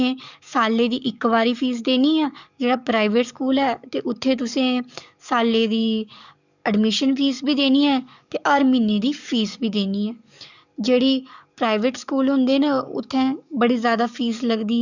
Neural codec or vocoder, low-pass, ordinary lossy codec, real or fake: codec, 44.1 kHz, 7.8 kbps, DAC; 7.2 kHz; none; fake